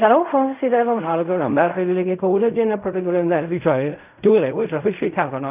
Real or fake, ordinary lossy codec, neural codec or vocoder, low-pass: fake; none; codec, 16 kHz in and 24 kHz out, 0.4 kbps, LongCat-Audio-Codec, fine tuned four codebook decoder; 3.6 kHz